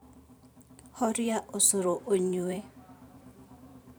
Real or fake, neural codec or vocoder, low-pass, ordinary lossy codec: fake; vocoder, 44.1 kHz, 128 mel bands every 512 samples, BigVGAN v2; none; none